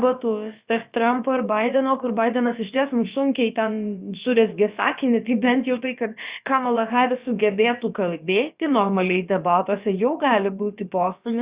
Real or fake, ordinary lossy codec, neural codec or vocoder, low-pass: fake; Opus, 64 kbps; codec, 16 kHz, about 1 kbps, DyCAST, with the encoder's durations; 3.6 kHz